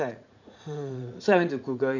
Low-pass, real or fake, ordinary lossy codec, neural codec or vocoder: 7.2 kHz; fake; none; vocoder, 44.1 kHz, 80 mel bands, Vocos